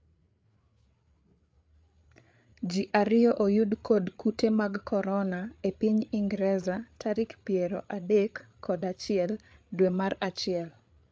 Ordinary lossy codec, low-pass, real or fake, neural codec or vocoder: none; none; fake; codec, 16 kHz, 8 kbps, FreqCodec, larger model